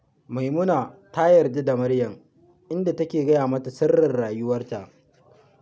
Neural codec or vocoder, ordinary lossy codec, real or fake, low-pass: none; none; real; none